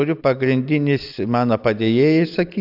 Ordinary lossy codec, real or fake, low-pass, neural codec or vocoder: AAC, 48 kbps; real; 5.4 kHz; none